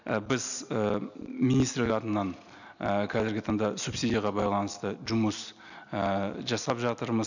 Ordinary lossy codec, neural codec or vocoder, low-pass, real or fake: none; none; 7.2 kHz; real